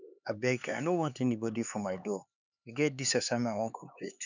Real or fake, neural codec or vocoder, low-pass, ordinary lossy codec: fake; codec, 16 kHz, 4 kbps, X-Codec, HuBERT features, trained on LibriSpeech; 7.2 kHz; none